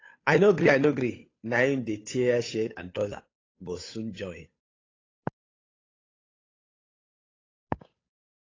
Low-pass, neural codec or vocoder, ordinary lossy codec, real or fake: 7.2 kHz; codec, 16 kHz, 8 kbps, FunCodec, trained on Chinese and English, 25 frames a second; AAC, 32 kbps; fake